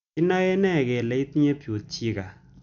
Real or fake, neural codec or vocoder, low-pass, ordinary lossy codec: real; none; 7.2 kHz; none